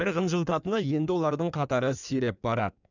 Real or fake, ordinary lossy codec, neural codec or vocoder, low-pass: fake; none; codec, 16 kHz in and 24 kHz out, 1.1 kbps, FireRedTTS-2 codec; 7.2 kHz